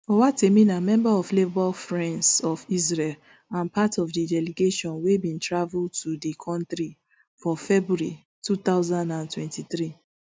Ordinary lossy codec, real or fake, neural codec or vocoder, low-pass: none; real; none; none